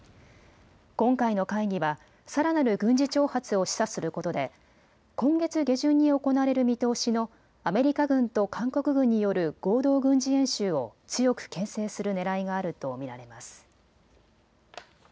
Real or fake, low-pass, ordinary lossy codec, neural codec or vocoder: real; none; none; none